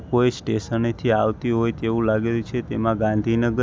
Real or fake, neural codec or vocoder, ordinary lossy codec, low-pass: real; none; none; none